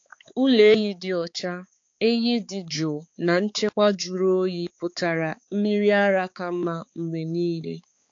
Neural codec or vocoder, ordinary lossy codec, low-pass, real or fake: codec, 16 kHz, 4 kbps, X-Codec, HuBERT features, trained on balanced general audio; AAC, 48 kbps; 7.2 kHz; fake